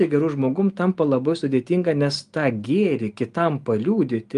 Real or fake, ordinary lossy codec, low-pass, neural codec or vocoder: real; Opus, 32 kbps; 10.8 kHz; none